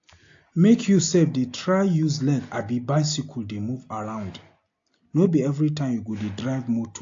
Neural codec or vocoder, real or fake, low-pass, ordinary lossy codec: none; real; 7.2 kHz; AAC, 48 kbps